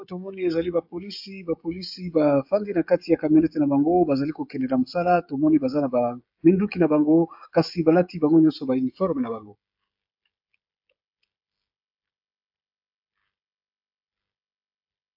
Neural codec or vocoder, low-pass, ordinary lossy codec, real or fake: codec, 16 kHz, 16 kbps, FreqCodec, smaller model; 5.4 kHz; AAC, 48 kbps; fake